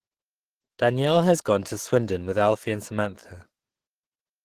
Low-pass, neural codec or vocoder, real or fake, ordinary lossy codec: 14.4 kHz; codec, 44.1 kHz, 7.8 kbps, DAC; fake; Opus, 16 kbps